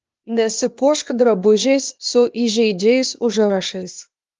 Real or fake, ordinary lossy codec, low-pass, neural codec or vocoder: fake; Opus, 32 kbps; 7.2 kHz; codec, 16 kHz, 0.8 kbps, ZipCodec